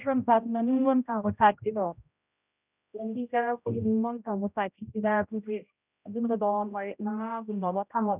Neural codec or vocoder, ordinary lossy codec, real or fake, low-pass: codec, 16 kHz, 0.5 kbps, X-Codec, HuBERT features, trained on general audio; none; fake; 3.6 kHz